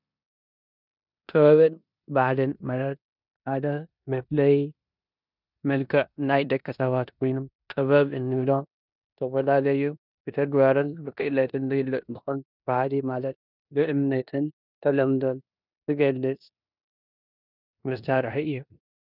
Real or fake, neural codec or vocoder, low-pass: fake; codec, 16 kHz in and 24 kHz out, 0.9 kbps, LongCat-Audio-Codec, four codebook decoder; 5.4 kHz